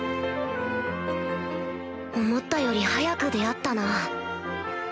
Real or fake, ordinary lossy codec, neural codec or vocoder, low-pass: real; none; none; none